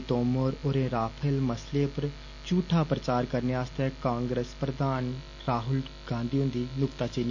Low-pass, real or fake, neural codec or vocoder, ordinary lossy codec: 7.2 kHz; real; none; none